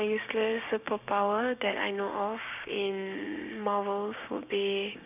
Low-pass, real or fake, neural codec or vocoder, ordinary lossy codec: 3.6 kHz; fake; codec, 16 kHz, 6 kbps, DAC; none